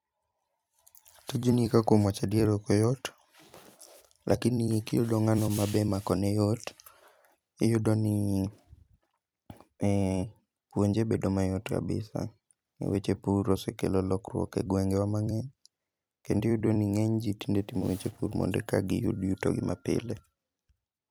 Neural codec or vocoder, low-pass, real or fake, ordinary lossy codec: vocoder, 44.1 kHz, 128 mel bands every 256 samples, BigVGAN v2; none; fake; none